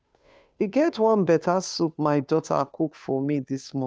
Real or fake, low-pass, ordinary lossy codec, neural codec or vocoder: fake; none; none; codec, 16 kHz, 2 kbps, FunCodec, trained on Chinese and English, 25 frames a second